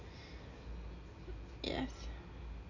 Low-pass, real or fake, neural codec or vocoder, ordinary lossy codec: 7.2 kHz; real; none; none